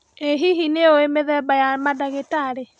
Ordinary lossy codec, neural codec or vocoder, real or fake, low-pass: none; none; real; 9.9 kHz